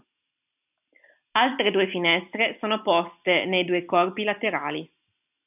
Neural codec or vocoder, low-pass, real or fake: none; 3.6 kHz; real